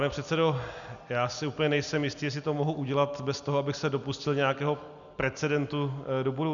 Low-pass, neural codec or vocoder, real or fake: 7.2 kHz; none; real